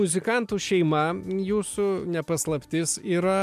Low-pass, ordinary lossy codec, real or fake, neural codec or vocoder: 14.4 kHz; MP3, 96 kbps; real; none